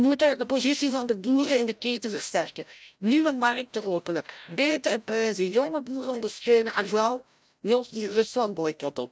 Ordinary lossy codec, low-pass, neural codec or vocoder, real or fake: none; none; codec, 16 kHz, 0.5 kbps, FreqCodec, larger model; fake